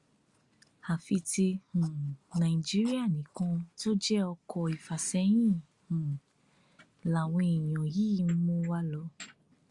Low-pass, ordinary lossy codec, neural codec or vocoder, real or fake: 10.8 kHz; Opus, 64 kbps; none; real